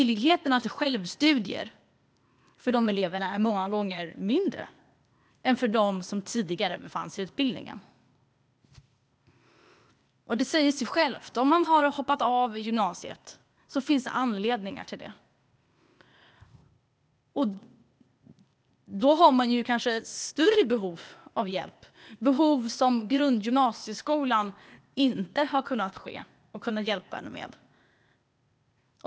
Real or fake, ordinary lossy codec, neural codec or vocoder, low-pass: fake; none; codec, 16 kHz, 0.8 kbps, ZipCodec; none